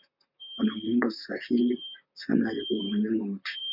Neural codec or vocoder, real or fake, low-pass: vocoder, 44.1 kHz, 128 mel bands, Pupu-Vocoder; fake; 5.4 kHz